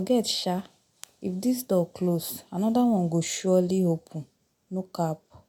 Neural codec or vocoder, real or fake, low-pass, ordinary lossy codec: none; real; none; none